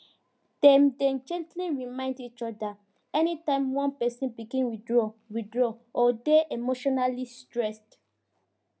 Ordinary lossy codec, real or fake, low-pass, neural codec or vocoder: none; real; none; none